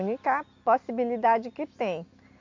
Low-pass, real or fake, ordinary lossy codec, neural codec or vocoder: 7.2 kHz; real; MP3, 48 kbps; none